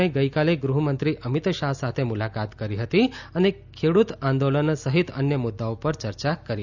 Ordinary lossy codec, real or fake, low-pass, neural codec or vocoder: none; real; 7.2 kHz; none